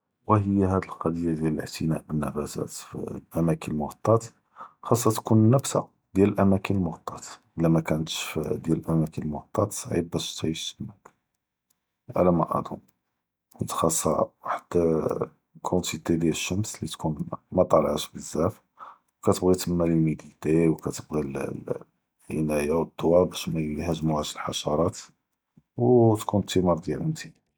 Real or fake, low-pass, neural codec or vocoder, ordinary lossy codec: real; none; none; none